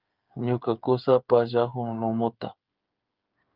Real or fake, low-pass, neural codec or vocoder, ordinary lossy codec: fake; 5.4 kHz; codec, 16 kHz, 8 kbps, FreqCodec, smaller model; Opus, 24 kbps